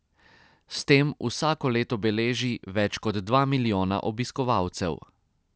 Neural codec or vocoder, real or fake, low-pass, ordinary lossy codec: none; real; none; none